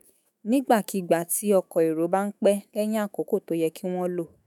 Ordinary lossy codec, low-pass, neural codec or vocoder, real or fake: none; none; autoencoder, 48 kHz, 128 numbers a frame, DAC-VAE, trained on Japanese speech; fake